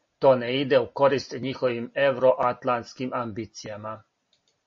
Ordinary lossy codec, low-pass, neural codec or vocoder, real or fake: MP3, 32 kbps; 7.2 kHz; none; real